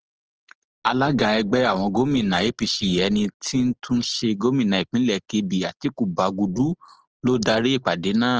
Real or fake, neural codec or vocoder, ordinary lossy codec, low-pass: real; none; Opus, 16 kbps; 7.2 kHz